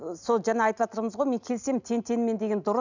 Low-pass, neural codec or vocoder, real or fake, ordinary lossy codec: 7.2 kHz; none; real; none